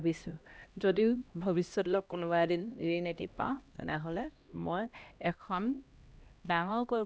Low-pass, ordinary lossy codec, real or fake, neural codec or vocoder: none; none; fake; codec, 16 kHz, 1 kbps, X-Codec, HuBERT features, trained on LibriSpeech